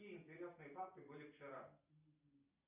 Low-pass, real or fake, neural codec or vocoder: 3.6 kHz; real; none